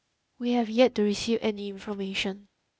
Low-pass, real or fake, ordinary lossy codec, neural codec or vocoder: none; fake; none; codec, 16 kHz, 0.8 kbps, ZipCodec